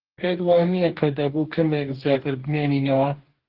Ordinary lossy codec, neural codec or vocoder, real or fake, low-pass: Opus, 16 kbps; codec, 44.1 kHz, 2.6 kbps, DAC; fake; 5.4 kHz